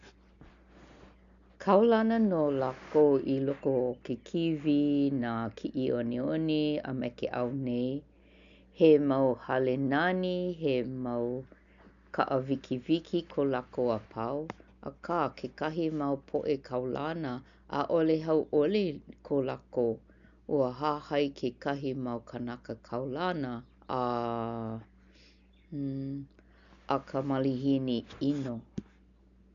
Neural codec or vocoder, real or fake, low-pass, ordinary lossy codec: none; real; 7.2 kHz; none